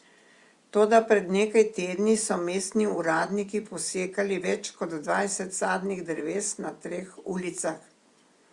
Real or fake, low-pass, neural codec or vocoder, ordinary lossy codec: fake; 10.8 kHz; vocoder, 24 kHz, 100 mel bands, Vocos; Opus, 64 kbps